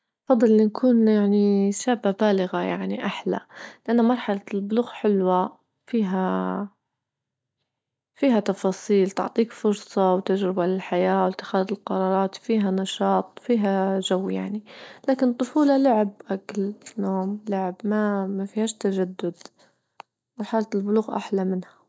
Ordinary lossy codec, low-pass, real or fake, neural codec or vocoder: none; none; real; none